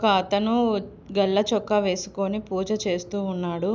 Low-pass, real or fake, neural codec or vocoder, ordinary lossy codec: none; real; none; none